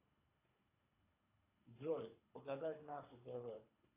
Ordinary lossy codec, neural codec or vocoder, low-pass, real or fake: AAC, 24 kbps; codec, 24 kHz, 3 kbps, HILCodec; 3.6 kHz; fake